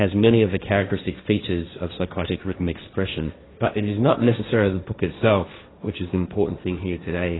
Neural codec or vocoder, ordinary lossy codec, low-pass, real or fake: codec, 16 kHz, 1.1 kbps, Voila-Tokenizer; AAC, 16 kbps; 7.2 kHz; fake